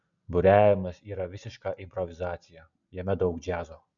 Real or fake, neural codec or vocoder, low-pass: real; none; 7.2 kHz